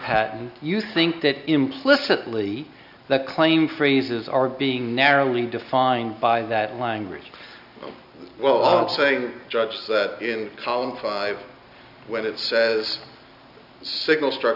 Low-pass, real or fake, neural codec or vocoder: 5.4 kHz; real; none